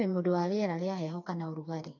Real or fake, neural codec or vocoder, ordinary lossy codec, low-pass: fake; codec, 16 kHz, 4 kbps, FreqCodec, smaller model; AAC, 48 kbps; 7.2 kHz